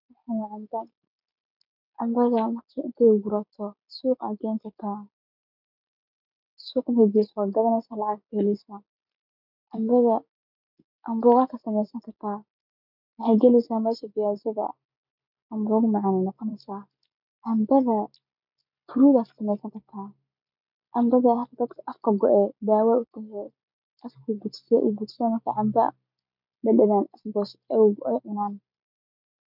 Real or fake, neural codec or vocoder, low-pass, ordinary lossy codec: real; none; 5.4 kHz; none